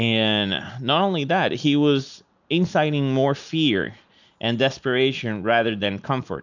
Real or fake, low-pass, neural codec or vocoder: real; 7.2 kHz; none